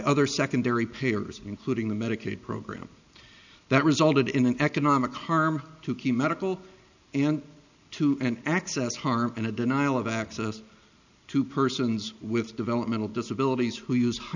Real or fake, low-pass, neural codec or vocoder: real; 7.2 kHz; none